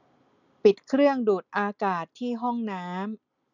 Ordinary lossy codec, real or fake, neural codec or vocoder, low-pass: none; real; none; 7.2 kHz